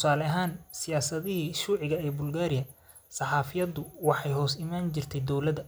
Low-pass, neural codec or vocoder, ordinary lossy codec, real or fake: none; none; none; real